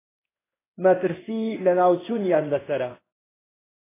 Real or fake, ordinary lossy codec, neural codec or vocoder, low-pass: fake; AAC, 16 kbps; codec, 16 kHz in and 24 kHz out, 1 kbps, XY-Tokenizer; 3.6 kHz